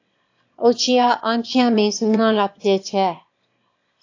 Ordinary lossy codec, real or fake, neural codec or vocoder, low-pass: AAC, 48 kbps; fake; autoencoder, 22.05 kHz, a latent of 192 numbers a frame, VITS, trained on one speaker; 7.2 kHz